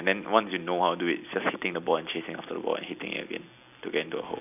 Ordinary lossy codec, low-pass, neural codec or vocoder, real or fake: none; 3.6 kHz; none; real